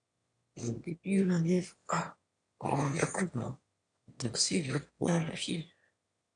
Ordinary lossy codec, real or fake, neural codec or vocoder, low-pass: none; fake; autoencoder, 22.05 kHz, a latent of 192 numbers a frame, VITS, trained on one speaker; 9.9 kHz